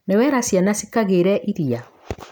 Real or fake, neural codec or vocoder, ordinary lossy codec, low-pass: real; none; none; none